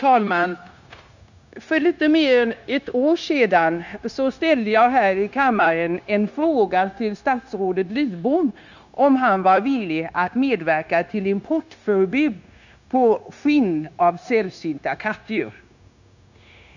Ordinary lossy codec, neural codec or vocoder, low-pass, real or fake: none; codec, 16 kHz, 0.9 kbps, LongCat-Audio-Codec; 7.2 kHz; fake